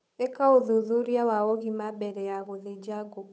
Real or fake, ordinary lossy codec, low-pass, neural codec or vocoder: fake; none; none; codec, 16 kHz, 8 kbps, FunCodec, trained on Chinese and English, 25 frames a second